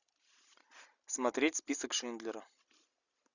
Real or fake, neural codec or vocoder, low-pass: real; none; 7.2 kHz